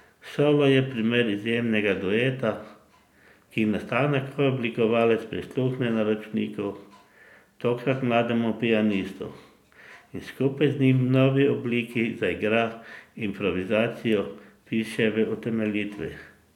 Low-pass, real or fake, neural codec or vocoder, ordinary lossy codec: 19.8 kHz; real; none; none